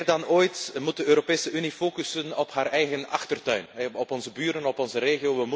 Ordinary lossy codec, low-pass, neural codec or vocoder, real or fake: none; none; none; real